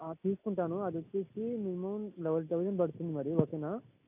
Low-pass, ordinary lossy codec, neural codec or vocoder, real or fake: 3.6 kHz; none; none; real